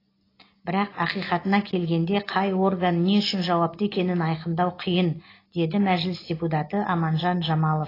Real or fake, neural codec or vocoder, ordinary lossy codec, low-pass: real; none; AAC, 24 kbps; 5.4 kHz